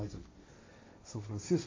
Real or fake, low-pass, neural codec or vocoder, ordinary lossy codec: fake; 7.2 kHz; codec, 16 kHz, 1.1 kbps, Voila-Tokenizer; MP3, 32 kbps